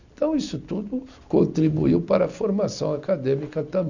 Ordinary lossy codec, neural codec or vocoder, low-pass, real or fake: none; none; 7.2 kHz; real